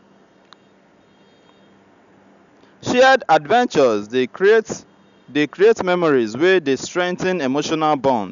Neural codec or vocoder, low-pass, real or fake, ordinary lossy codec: none; 7.2 kHz; real; none